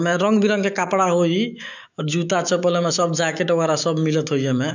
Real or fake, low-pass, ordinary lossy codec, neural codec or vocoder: fake; 7.2 kHz; none; autoencoder, 48 kHz, 128 numbers a frame, DAC-VAE, trained on Japanese speech